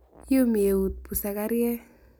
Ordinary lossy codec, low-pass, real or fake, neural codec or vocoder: none; none; real; none